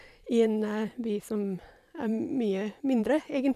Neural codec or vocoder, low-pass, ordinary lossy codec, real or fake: vocoder, 44.1 kHz, 128 mel bands every 512 samples, BigVGAN v2; 14.4 kHz; MP3, 96 kbps; fake